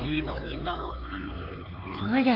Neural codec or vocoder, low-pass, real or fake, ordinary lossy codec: codec, 16 kHz, 4 kbps, X-Codec, HuBERT features, trained on LibriSpeech; 5.4 kHz; fake; AAC, 32 kbps